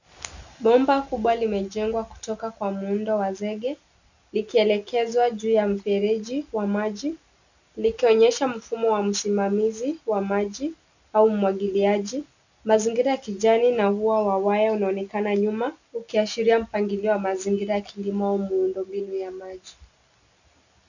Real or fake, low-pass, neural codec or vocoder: real; 7.2 kHz; none